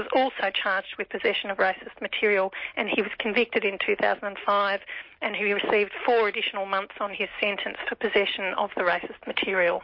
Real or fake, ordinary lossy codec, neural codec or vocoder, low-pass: real; MP3, 32 kbps; none; 5.4 kHz